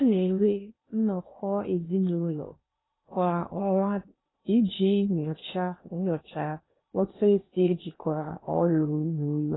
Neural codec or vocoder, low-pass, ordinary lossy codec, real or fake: codec, 16 kHz in and 24 kHz out, 0.8 kbps, FocalCodec, streaming, 65536 codes; 7.2 kHz; AAC, 16 kbps; fake